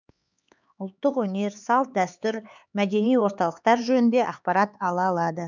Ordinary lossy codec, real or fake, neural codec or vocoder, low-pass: none; fake; codec, 16 kHz, 4 kbps, X-Codec, HuBERT features, trained on LibriSpeech; 7.2 kHz